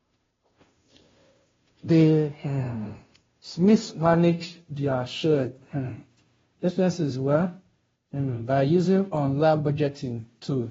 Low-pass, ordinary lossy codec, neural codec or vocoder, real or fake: 7.2 kHz; AAC, 24 kbps; codec, 16 kHz, 0.5 kbps, FunCodec, trained on Chinese and English, 25 frames a second; fake